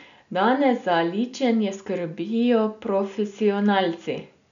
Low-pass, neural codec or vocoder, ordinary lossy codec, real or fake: 7.2 kHz; none; none; real